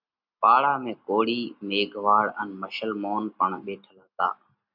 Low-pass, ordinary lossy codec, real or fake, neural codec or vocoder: 5.4 kHz; MP3, 48 kbps; real; none